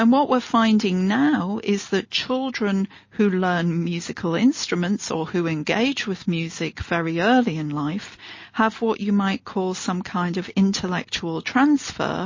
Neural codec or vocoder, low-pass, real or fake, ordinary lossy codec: none; 7.2 kHz; real; MP3, 32 kbps